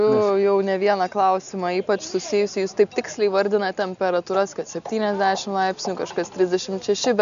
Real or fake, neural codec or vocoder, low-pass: real; none; 7.2 kHz